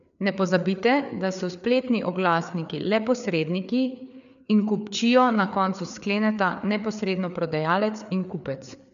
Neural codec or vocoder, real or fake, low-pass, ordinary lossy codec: codec, 16 kHz, 4 kbps, FreqCodec, larger model; fake; 7.2 kHz; none